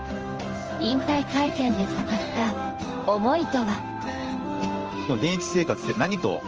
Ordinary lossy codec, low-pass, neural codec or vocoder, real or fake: Opus, 24 kbps; 7.2 kHz; codec, 16 kHz in and 24 kHz out, 1 kbps, XY-Tokenizer; fake